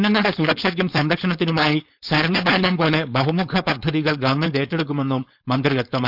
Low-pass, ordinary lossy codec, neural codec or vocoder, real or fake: 5.4 kHz; none; codec, 16 kHz, 4.8 kbps, FACodec; fake